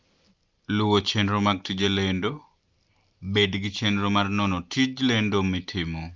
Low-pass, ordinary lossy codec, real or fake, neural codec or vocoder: 7.2 kHz; Opus, 24 kbps; real; none